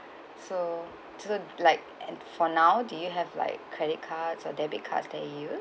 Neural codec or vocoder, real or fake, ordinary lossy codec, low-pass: none; real; none; none